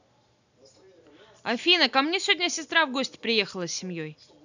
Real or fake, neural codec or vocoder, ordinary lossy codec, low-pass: real; none; none; 7.2 kHz